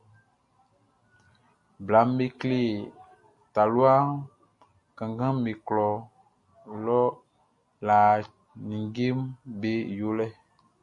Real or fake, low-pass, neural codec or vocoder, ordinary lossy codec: real; 10.8 kHz; none; MP3, 48 kbps